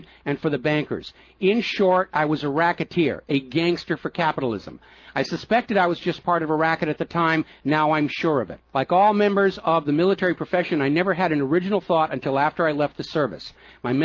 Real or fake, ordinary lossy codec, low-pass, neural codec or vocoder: real; Opus, 24 kbps; 7.2 kHz; none